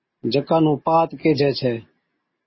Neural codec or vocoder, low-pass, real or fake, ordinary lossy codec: none; 7.2 kHz; real; MP3, 24 kbps